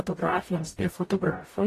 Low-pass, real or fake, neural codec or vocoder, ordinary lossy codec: 14.4 kHz; fake; codec, 44.1 kHz, 0.9 kbps, DAC; AAC, 48 kbps